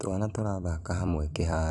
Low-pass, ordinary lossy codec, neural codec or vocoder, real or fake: 10.8 kHz; none; vocoder, 44.1 kHz, 128 mel bands every 256 samples, BigVGAN v2; fake